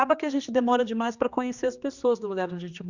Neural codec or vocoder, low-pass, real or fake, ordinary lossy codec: codec, 16 kHz, 2 kbps, X-Codec, HuBERT features, trained on general audio; 7.2 kHz; fake; Opus, 64 kbps